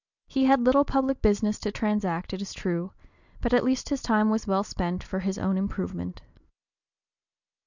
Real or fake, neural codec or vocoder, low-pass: real; none; 7.2 kHz